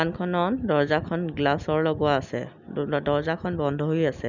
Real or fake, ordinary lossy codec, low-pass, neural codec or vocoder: real; none; 7.2 kHz; none